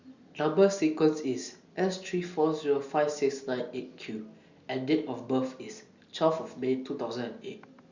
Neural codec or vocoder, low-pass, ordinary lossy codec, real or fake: none; 7.2 kHz; Opus, 64 kbps; real